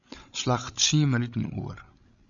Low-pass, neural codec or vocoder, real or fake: 7.2 kHz; codec, 16 kHz, 16 kbps, FreqCodec, larger model; fake